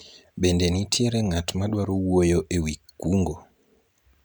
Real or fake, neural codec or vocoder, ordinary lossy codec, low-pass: real; none; none; none